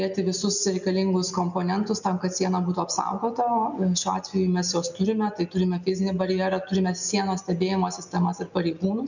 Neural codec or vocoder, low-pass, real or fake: none; 7.2 kHz; real